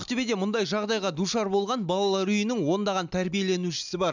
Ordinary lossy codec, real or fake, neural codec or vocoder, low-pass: none; real; none; 7.2 kHz